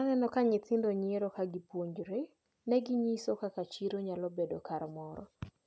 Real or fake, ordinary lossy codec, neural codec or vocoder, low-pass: real; none; none; none